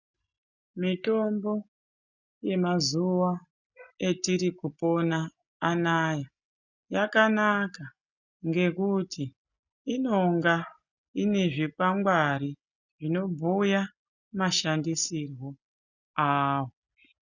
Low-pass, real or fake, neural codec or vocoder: 7.2 kHz; real; none